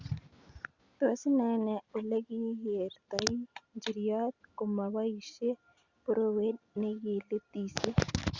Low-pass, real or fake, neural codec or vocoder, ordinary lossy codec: 7.2 kHz; real; none; Opus, 64 kbps